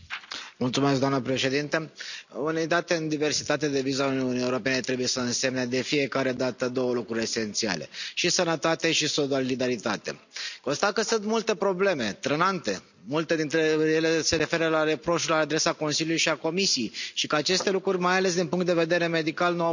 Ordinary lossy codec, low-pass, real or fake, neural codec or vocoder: none; 7.2 kHz; real; none